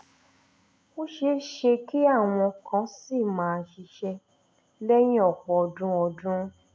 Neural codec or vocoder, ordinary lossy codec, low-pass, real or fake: none; none; none; real